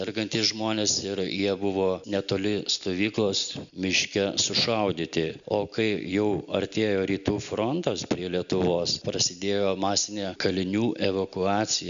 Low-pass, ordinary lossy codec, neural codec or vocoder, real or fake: 7.2 kHz; MP3, 96 kbps; none; real